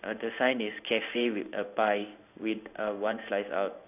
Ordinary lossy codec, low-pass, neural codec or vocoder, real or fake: none; 3.6 kHz; codec, 16 kHz in and 24 kHz out, 1 kbps, XY-Tokenizer; fake